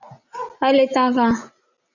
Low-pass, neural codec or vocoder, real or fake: 7.2 kHz; none; real